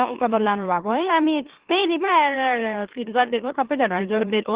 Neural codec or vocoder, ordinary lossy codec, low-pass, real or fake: autoencoder, 44.1 kHz, a latent of 192 numbers a frame, MeloTTS; Opus, 16 kbps; 3.6 kHz; fake